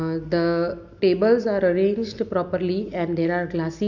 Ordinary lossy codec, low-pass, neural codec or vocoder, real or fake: none; 7.2 kHz; none; real